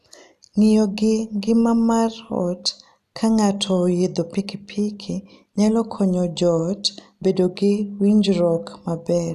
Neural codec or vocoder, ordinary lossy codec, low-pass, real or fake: none; none; 14.4 kHz; real